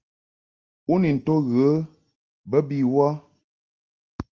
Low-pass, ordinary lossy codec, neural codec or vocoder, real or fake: 7.2 kHz; Opus, 24 kbps; none; real